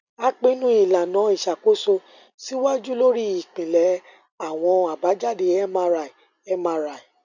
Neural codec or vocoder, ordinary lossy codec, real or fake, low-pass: none; none; real; 7.2 kHz